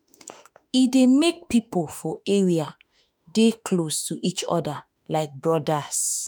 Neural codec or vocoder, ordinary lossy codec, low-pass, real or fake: autoencoder, 48 kHz, 32 numbers a frame, DAC-VAE, trained on Japanese speech; none; none; fake